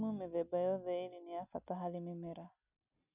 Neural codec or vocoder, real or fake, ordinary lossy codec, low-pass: none; real; none; 3.6 kHz